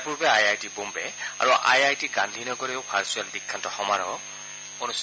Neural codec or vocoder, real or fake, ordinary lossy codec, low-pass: none; real; none; none